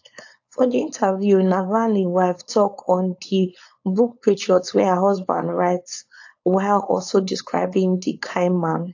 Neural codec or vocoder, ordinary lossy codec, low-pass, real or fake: codec, 16 kHz, 4.8 kbps, FACodec; AAC, 48 kbps; 7.2 kHz; fake